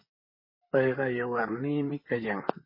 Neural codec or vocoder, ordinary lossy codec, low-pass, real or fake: vocoder, 44.1 kHz, 128 mel bands, Pupu-Vocoder; MP3, 24 kbps; 5.4 kHz; fake